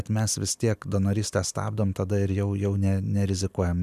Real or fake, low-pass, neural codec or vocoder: real; 14.4 kHz; none